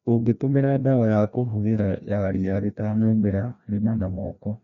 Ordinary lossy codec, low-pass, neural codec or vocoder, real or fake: MP3, 96 kbps; 7.2 kHz; codec, 16 kHz, 1 kbps, FreqCodec, larger model; fake